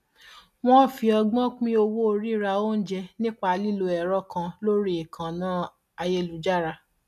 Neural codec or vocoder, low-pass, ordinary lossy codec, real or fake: none; 14.4 kHz; none; real